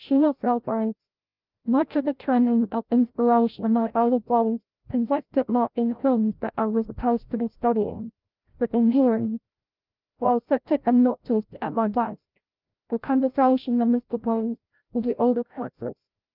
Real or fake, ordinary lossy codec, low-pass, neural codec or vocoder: fake; Opus, 32 kbps; 5.4 kHz; codec, 16 kHz, 0.5 kbps, FreqCodec, larger model